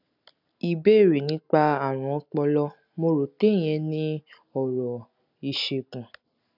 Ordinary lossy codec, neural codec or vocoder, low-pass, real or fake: none; none; 5.4 kHz; real